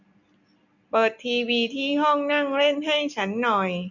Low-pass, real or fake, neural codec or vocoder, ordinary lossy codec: 7.2 kHz; real; none; none